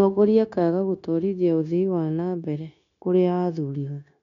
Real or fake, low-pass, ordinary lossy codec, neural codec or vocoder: fake; 7.2 kHz; none; codec, 16 kHz, 0.9 kbps, LongCat-Audio-Codec